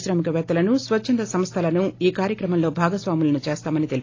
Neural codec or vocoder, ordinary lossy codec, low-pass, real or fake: none; AAC, 32 kbps; 7.2 kHz; real